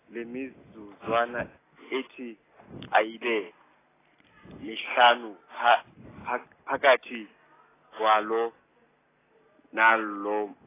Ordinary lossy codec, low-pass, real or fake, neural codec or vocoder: AAC, 16 kbps; 3.6 kHz; real; none